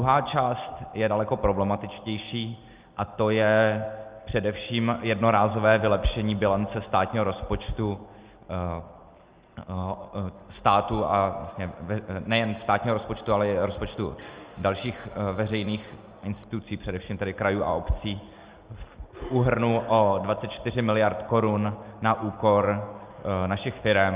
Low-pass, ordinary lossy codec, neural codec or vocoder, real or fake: 3.6 kHz; Opus, 24 kbps; none; real